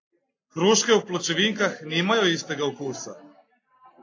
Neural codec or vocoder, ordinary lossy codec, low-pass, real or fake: none; AAC, 32 kbps; 7.2 kHz; real